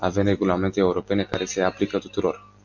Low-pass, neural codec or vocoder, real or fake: 7.2 kHz; none; real